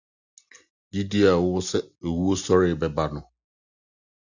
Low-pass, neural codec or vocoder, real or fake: 7.2 kHz; none; real